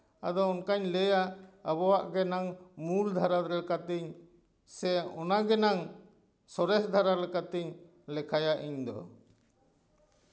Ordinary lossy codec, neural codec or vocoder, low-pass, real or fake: none; none; none; real